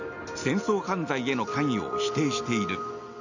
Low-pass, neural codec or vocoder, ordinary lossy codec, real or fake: 7.2 kHz; none; none; real